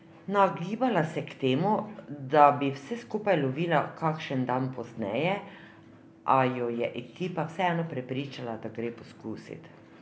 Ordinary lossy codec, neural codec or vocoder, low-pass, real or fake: none; none; none; real